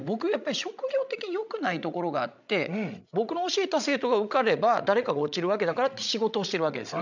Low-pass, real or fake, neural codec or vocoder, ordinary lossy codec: 7.2 kHz; fake; codec, 16 kHz, 16 kbps, FreqCodec, larger model; none